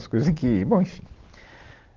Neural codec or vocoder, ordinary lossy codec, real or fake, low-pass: none; Opus, 16 kbps; real; 7.2 kHz